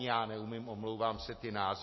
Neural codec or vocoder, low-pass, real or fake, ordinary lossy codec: none; 7.2 kHz; real; MP3, 24 kbps